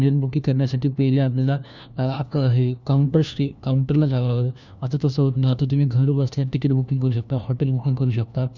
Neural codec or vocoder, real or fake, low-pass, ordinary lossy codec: codec, 16 kHz, 1 kbps, FunCodec, trained on LibriTTS, 50 frames a second; fake; 7.2 kHz; none